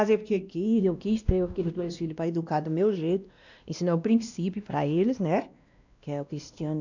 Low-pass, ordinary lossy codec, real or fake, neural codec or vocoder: 7.2 kHz; none; fake; codec, 16 kHz, 1 kbps, X-Codec, WavLM features, trained on Multilingual LibriSpeech